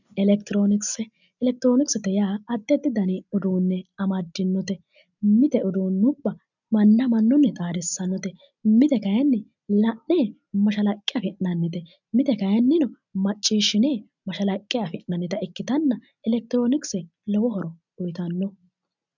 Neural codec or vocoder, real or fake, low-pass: none; real; 7.2 kHz